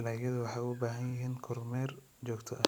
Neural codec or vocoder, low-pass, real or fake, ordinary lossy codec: vocoder, 44.1 kHz, 128 mel bands every 256 samples, BigVGAN v2; none; fake; none